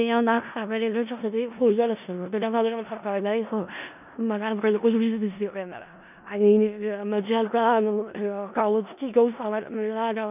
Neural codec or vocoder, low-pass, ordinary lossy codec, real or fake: codec, 16 kHz in and 24 kHz out, 0.4 kbps, LongCat-Audio-Codec, four codebook decoder; 3.6 kHz; none; fake